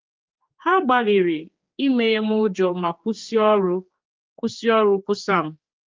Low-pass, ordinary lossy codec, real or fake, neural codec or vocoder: 7.2 kHz; Opus, 32 kbps; fake; codec, 16 kHz, 4 kbps, X-Codec, HuBERT features, trained on general audio